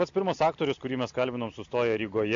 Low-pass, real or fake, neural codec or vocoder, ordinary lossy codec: 7.2 kHz; real; none; AAC, 64 kbps